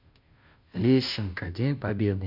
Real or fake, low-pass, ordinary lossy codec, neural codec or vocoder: fake; 5.4 kHz; none; codec, 16 kHz, 0.5 kbps, FunCodec, trained on Chinese and English, 25 frames a second